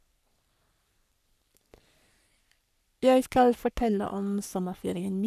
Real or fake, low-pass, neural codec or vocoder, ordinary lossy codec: fake; 14.4 kHz; codec, 44.1 kHz, 3.4 kbps, Pupu-Codec; none